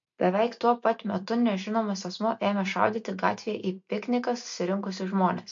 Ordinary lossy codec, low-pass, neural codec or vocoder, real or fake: MP3, 48 kbps; 7.2 kHz; none; real